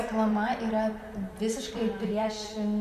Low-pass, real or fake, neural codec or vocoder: 14.4 kHz; fake; vocoder, 44.1 kHz, 128 mel bands, Pupu-Vocoder